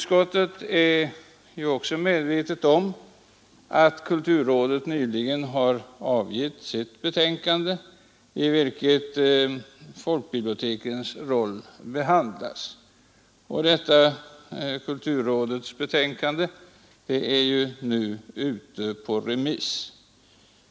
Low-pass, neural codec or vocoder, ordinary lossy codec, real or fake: none; none; none; real